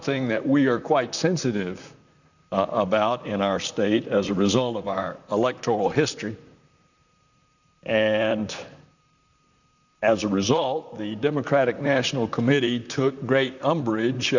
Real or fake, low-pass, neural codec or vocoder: fake; 7.2 kHz; vocoder, 44.1 kHz, 128 mel bands, Pupu-Vocoder